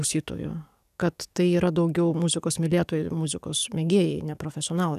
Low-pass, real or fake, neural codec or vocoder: 14.4 kHz; fake; codec, 44.1 kHz, 7.8 kbps, DAC